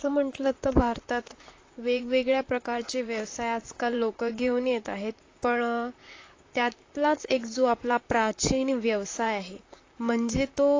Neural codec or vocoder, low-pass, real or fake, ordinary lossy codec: vocoder, 44.1 kHz, 128 mel bands, Pupu-Vocoder; 7.2 kHz; fake; AAC, 32 kbps